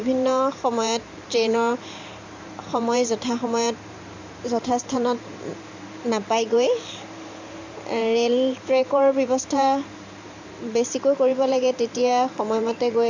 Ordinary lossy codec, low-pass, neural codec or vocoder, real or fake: MP3, 64 kbps; 7.2 kHz; vocoder, 44.1 kHz, 128 mel bands every 512 samples, BigVGAN v2; fake